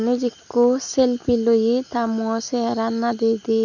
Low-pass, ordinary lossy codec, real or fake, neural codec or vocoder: 7.2 kHz; none; real; none